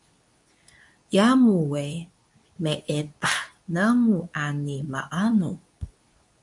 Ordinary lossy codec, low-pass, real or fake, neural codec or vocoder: MP3, 64 kbps; 10.8 kHz; fake; codec, 24 kHz, 0.9 kbps, WavTokenizer, medium speech release version 2